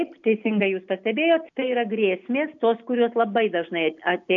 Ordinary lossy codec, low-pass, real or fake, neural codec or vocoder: AAC, 64 kbps; 7.2 kHz; real; none